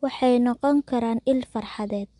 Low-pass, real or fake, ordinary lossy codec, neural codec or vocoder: 10.8 kHz; real; MP3, 64 kbps; none